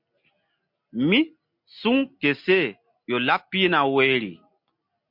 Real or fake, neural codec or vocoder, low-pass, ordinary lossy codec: real; none; 5.4 kHz; MP3, 48 kbps